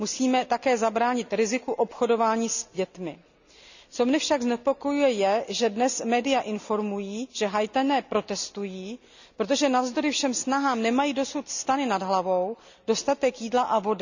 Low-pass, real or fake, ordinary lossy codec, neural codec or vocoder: 7.2 kHz; real; none; none